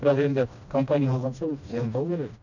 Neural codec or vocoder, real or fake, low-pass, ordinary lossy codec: codec, 16 kHz, 1 kbps, FreqCodec, smaller model; fake; 7.2 kHz; none